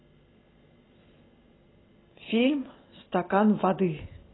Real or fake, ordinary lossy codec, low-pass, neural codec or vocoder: real; AAC, 16 kbps; 7.2 kHz; none